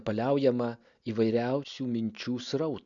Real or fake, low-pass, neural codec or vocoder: real; 7.2 kHz; none